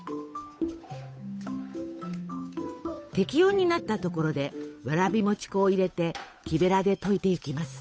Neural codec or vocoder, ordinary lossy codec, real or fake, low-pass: codec, 16 kHz, 8 kbps, FunCodec, trained on Chinese and English, 25 frames a second; none; fake; none